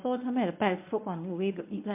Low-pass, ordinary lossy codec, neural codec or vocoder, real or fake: 3.6 kHz; MP3, 32 kbps; codec, 24 kHz, 0.9 kbps, WavTokenizer, medium speech release version 1; fake